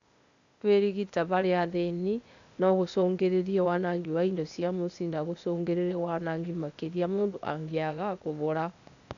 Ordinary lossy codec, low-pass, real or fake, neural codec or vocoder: none; 7.2 kHz; fake; codec, 16 kHz, 0.8 kbps, ZipCodec